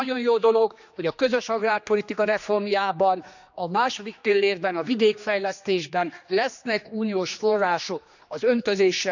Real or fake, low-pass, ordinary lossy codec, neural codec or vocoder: fake; 7.2 kHz; none; codec, 16 kHz, 2 kbps, X-Codec, HuBERT features, trained on general audio